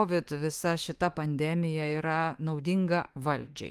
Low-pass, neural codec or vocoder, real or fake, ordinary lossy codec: 14.4 kHz; autoencoder, 48 kHz, 32 numbers a frame, DAC-VAE, trained on Japanese speech; fake; Opus, 24 kbps